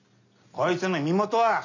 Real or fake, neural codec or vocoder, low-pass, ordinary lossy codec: real; none; 7.2 kHz; none